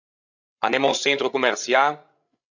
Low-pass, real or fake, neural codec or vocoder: 7.2 kHz; fake; codec, 16 kHz, 16 kbps, FreqCodec, larger model